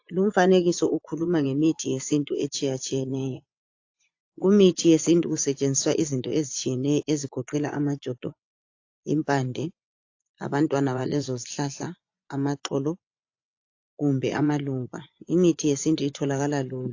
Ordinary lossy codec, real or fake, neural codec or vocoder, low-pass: AAC, 48 kbps; fake; vocoder, 24 kHz, 100 mel bands, Vocos; 7.2 kHz